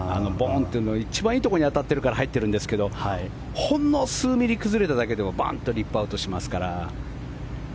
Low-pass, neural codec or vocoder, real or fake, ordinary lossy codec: none; none; real; none